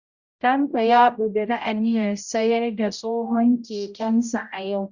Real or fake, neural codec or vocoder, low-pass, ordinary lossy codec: fake; codec, 16 kHz, 0.5 kbps, X-Codec, HuBERT features, trained on general audio; 7.2 kHz; Opus, 64 kbps